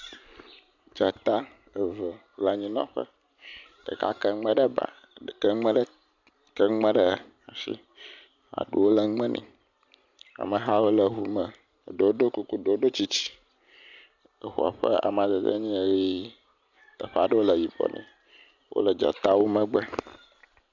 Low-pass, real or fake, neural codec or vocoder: 7.2 kHz; real; none